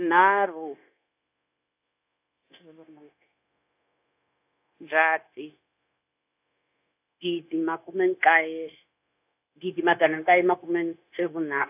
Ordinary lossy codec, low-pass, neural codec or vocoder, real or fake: AAC, 32 kbps; 3.6 kHz; codec, 16 kHz, 0.9 kbps, LongCat-Audio-Codec; fake